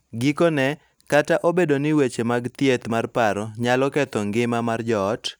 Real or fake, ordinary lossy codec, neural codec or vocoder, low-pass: real; none; none; none